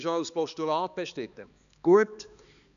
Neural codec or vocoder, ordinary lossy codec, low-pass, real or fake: codec, 16 kHz, 2 kbps, X-Codec, HuBERT features, trained on balanced general audio; none; 7.2 kHz; fake